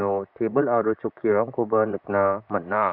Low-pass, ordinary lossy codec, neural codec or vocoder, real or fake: 5.4 kHz; none; vocoder, 44.1 kHz, 128 mel bands, Pupu-Vocoder; fake